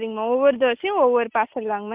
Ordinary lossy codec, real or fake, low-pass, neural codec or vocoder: Opus, 24 kbps; real; 3.6 kHz; none